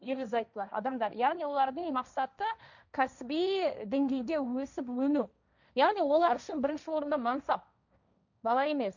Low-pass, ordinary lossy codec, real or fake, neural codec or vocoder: 7.2 kHz; none; fake; codec, 16 kHz, 1.1 kbps, Voila-Tokenizer